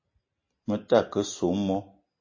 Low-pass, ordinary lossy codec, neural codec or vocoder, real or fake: 7.2 kHz; MP3, 32 kbps; none; real